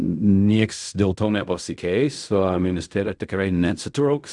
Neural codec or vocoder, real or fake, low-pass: codec, 16 kHz in and 24 kHz out, 0.4 kbps, LongCat-Audio-Codec, fine tuned four codebook decoder; fake; 10.8 kHz